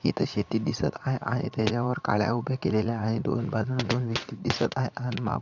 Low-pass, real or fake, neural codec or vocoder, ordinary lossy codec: 7.2 kHz; fake; vocoder, 44.1 kHz, 80 mel bands, Vocos; none